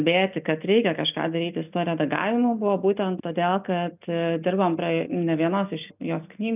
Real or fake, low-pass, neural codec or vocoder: real; 3.6 kHz; none